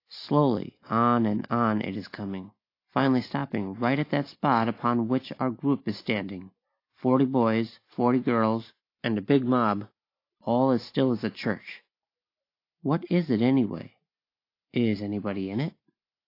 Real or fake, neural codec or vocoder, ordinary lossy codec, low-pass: real; none; AAC, 32 kbps; 5.4 kHz